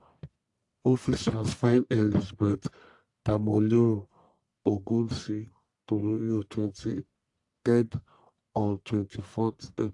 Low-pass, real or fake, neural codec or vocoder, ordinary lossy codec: 10.8 kHz; fake; codec, 44.1 kHz, 1.7 kbps, Pupu-Codec; AAC, 64 kbps